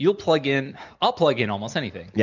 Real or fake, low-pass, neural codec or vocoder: real; 7.2 kHz; none